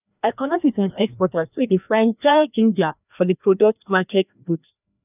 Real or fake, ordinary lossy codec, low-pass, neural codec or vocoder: fake; none; 3.6 kHz; codec, 16 kHz, 1 kbps, FreqCodec, larger model